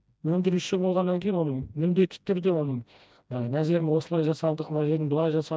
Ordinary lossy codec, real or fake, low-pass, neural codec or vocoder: none; fake; none; codec, 16 kHz, 1 kbps, FreqCodec, smaller model